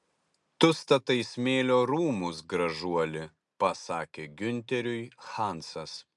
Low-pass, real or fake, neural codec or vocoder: 10.8 kHz; real; none